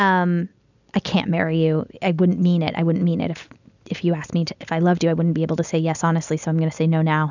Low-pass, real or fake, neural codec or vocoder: 7.2 kHz; real; none